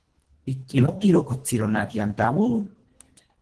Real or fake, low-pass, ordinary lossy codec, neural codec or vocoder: fake; 10.8 kHz; Opus, 16 kbps; codec, 24 kHz, 1.5 kbps, HILCodec